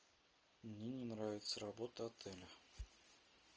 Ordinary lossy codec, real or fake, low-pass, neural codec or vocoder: Opus, 24 kbps; real; 7.2 kHz; none